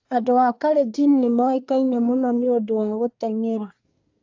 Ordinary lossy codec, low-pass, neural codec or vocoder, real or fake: AAC, 48 kbps; 7.2 kHz; codec, 24 kHz, 1 kbps, SNAC; fake